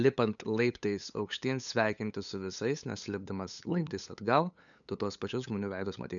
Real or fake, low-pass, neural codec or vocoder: fake; 7.2 kHz; codec, 16 kHz, 8 kbps, FunCodec, trained on LibriTTS, 25 frames a second